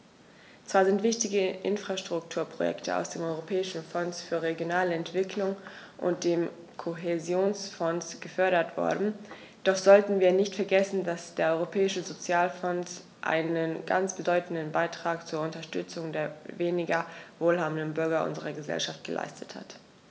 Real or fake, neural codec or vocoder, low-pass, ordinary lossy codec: real; none; none; none